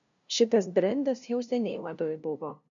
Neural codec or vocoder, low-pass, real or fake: codec, 16 kHz, 0.5 kbps, FunCodec, trained on LibriTTS, 25 frames a second; 7.2 kHz; fake